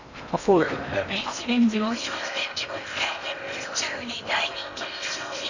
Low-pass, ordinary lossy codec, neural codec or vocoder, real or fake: 7.2 kHz; none; codec, 16 kHz in and 24 kHz out, 0.8 kbps, FocalCodec, streaming, 65536 codes; fake